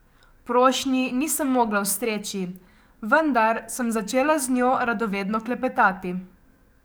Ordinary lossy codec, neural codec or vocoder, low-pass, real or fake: none; codec, 44.1 kHz, 7.8 kbps, DAC; none; fake